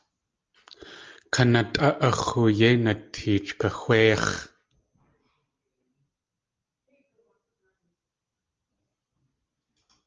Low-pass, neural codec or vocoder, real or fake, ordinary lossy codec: 7.2 kHz; none; real; Opus, 24 kbps